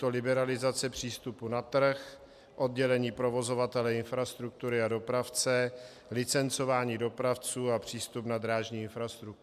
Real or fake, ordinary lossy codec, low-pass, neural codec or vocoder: real; MP3, 96 kbps; 14.4 kHz; none